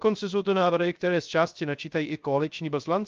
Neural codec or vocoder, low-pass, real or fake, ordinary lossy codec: codec, 16 kHz, 0.3 kbps, FocalCodec; 7.2 kHz; fake; Opus, 24 kbps